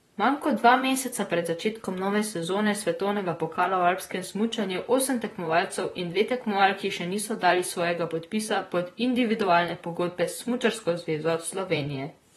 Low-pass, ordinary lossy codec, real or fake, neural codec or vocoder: 19.8 kHz; AAC, 32 kbps; fake; vocoder, 44.1 kHz, 128 mel bands, Pupu-Vocoder